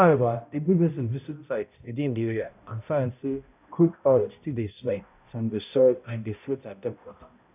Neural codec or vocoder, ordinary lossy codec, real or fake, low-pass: codec, 16 kHz, 0.5 kbps, X-Codec, HuBERT features, trained on balanced general audio; none; fake; 3.6 kHz